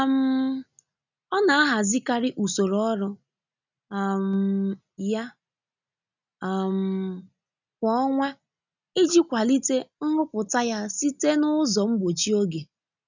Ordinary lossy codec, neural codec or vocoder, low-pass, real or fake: none; none; 7.2 kHz; real